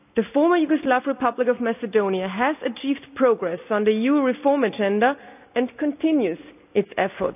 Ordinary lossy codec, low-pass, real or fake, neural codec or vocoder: none; 3.6 kHz; real; none